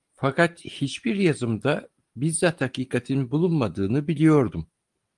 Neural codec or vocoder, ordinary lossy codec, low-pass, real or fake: none; Opus, 24 kbps; 10.8 kHz; real